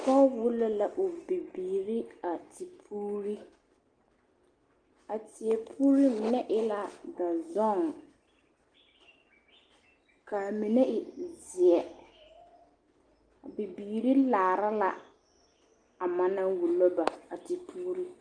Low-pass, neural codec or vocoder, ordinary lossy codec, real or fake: 9.9 kHz; none; Opus, 24 kbps; real